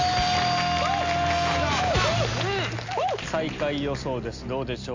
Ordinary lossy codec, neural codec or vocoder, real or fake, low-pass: MP3, 64 kbps; none; real; 7.2 kHz